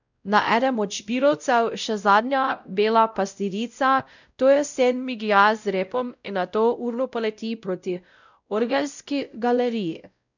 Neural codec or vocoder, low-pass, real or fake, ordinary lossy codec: codec, 16 kHz, 0.5 kbps, X-Codec, WavLM features, trained on Multilingual LibriSpeech; 7.2 kHz; fake; none